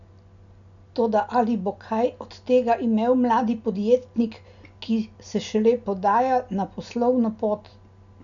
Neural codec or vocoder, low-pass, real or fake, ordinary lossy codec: none; 7.2 kHz; real; none